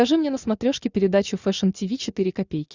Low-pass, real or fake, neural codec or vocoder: 7.2 kHz; real; none